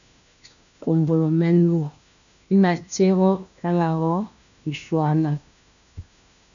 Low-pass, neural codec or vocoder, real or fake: 7.2 kHz; codec, 16 kHz, 1 kbps, FunCodec, trained on LibriTTS, 50 frames a second; fake